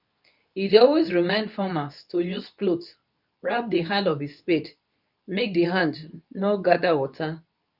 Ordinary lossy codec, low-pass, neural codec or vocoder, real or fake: none; 5.4 kHz; codec, 24 kHz, 0.9 kbps, WavTokenizer, medium speech release version 2; fake